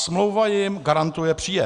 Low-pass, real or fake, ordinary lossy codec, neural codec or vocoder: 10.8 kHz; real; Opus, 64 kbps; none